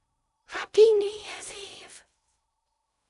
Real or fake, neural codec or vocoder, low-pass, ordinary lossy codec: fake; codec, 16 kHz in and 24 kHz out, 0.6 kbps, FocalCodec, streaming, 2048 codes; 10.8 kHz; none